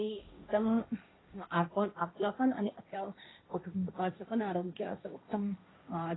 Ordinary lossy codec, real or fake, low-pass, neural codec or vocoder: AAC, 16 kbps; fake; 7.2 kHz; codec, 16 kHz, 1.1 kbps, Voila-Tokenizer